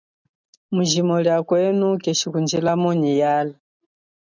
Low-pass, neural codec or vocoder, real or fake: 7.2 kHz; none; real